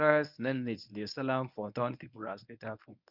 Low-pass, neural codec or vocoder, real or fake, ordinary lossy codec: 5.4 kHz; codec, 24 kHz, 0.9 kbps, WavTokenizer, medium speech release version 1; fake; none